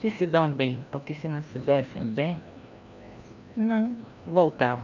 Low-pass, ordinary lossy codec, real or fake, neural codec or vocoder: 7.2 kHz; none; fake; codec, 16 kHz, 1 kbps, FreqCodec, larger model